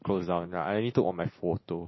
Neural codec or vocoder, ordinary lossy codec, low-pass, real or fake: none; MP3, 24 kbps; 7.2 kHz; real